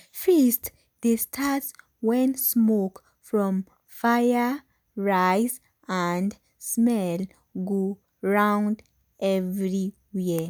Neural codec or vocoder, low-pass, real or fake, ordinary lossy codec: none; none; real; none